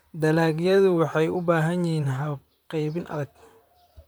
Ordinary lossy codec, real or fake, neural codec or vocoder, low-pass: none; fake; vocoder, 44.1 kHz, 128 mel bands, Pupu-Vocoder; none